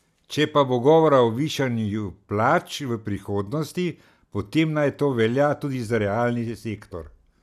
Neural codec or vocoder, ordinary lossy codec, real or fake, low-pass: none; none; real; 14.4 kHz